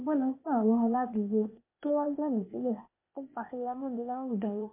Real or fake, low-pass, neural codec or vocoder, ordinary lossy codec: fake; 3.6 kHz; codec, 16 kHz, 0.8 kbps, ZipCodec; MP3, 32 kbps